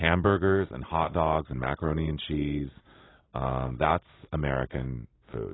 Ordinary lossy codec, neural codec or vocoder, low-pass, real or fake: AAC, 16 kbps; none; 7.2 kHz; real